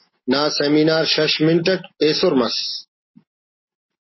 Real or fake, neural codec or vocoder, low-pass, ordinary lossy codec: real; none; 7.2 kHz; MP3, 24 kbps